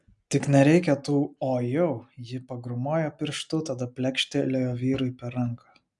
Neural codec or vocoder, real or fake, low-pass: none; real; 10.8 kHz